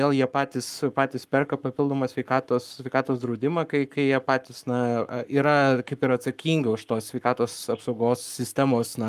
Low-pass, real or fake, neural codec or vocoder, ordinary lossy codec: 14.4 kHz; fake; codec, 44.1 kHz, 7.8 kbps, Pupu-Codec; Opus, 32 kbps